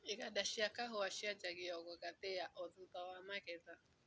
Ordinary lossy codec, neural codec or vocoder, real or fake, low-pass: none; none; real; none